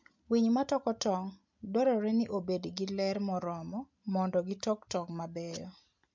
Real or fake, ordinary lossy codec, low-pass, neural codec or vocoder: real; MP3, 64 kbps; 7.2 kHz; none